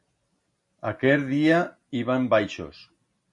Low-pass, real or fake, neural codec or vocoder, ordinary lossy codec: 10.8 kHz; real; none; MP3, 48 kbps